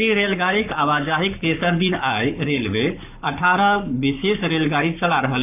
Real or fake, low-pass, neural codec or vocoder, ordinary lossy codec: fake; 3.6 kHz; codec, 44.1 kHz, 7.8 kbps, Pupu-Codec; none